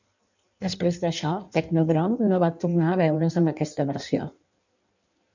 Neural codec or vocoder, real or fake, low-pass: codec, 16 kHz in and 24 kHz out, 1.1 kbps, FireRedTTS-2 codec; fake; 7.2 kHz